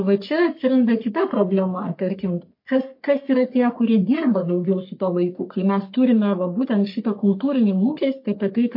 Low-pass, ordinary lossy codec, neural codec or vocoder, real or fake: 5.4 kHz; MP3, 32 kbps; codec, 44.1 kHz, 3.4 kbps, Pupu-Codec; fake